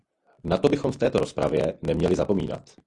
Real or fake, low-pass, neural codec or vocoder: real; 10.8 kHz; none